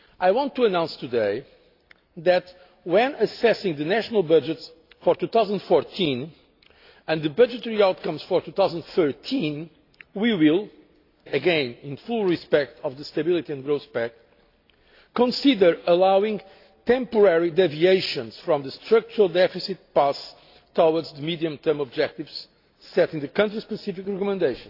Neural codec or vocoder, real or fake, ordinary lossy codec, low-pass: none; real; AAC, 32 kbps; 5.4 kHz